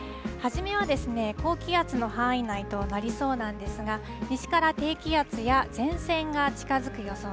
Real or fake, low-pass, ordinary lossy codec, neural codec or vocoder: real; none; none; none